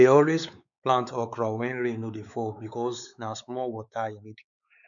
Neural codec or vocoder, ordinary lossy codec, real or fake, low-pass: codec, 16 kHz, 4 kbps, X-Codec, WavLM features, trained on Multilingual LibriSpeech; none; fake; 7.2 kHz